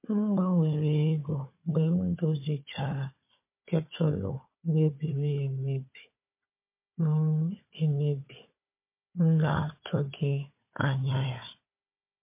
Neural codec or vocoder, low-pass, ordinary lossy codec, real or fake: codec, 16 kHz, 4 kbps, FunCodec, trained on Chinese and English, 50 frames a second; 3.6 kHz; MP3, 24 kbps; fake